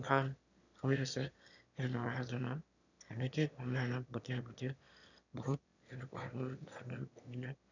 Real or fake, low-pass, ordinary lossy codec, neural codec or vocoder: fake; 7.2 kHz; none; autoencoder, 22.05 kHz, a latent of 192 numbers a frame, VITS, trained on one speaker